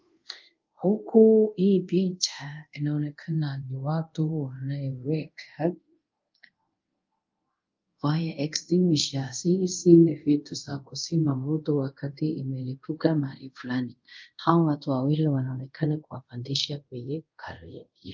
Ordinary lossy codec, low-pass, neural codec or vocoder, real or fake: Opus, 32 kbps; 7.2 kHz; codec, 24 kHz, 0.5 kbps, DualCodec; fake